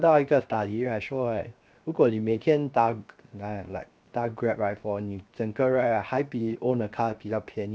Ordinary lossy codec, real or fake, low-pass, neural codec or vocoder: none; fake; none; codec, 16 kHz, 0.7 kbps, FocalCodec